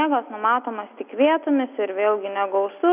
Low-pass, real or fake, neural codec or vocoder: 3.6 kHz; real; none